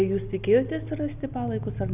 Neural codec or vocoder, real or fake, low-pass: none; real; 3.6 kHz